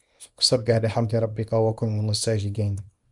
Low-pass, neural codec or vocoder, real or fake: 10.8 kHz; codec, 24 kHz, 0.9 kbps, WavTokenizer, small release; fake